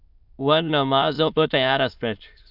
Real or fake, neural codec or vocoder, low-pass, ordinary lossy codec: fake; autoencoder, 22.05 kHz, a latent of 192 numbers a frame, VITS, trained on many speakers; 5.4 kHz; AAC, 48 kbps